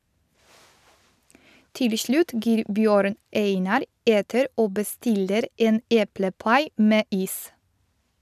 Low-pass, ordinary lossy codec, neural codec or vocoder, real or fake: 14.4 kHz; none; none; real